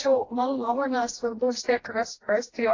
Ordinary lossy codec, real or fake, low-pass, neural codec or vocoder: AAC, 32 kbps; fake; 7.2 kHz; codec, 16 kHz, 1 kbps, FreqCodec, smaller model